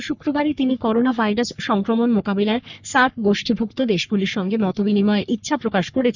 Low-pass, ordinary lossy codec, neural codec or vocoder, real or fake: 7.2 kHz; none; codec, 44.1 kHz, 3.4 kbps, Pupu-Codec; fake